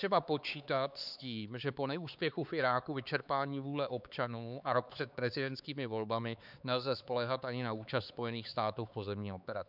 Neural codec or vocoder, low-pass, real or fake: codec, 16 kHz, 4 kbps, X-Codec, HuBERT features, trained on LibriSpeech; 5.4 kHz; fake